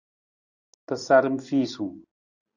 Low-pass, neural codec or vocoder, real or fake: 7.2 kHz; vocoder, 44.1 kHz, 128 mel bands every 256 samples, BigVGAN v2; fake